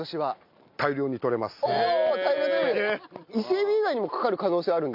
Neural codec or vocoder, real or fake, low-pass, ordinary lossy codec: none; real; 5.4 kHz; MP3, 48 kbps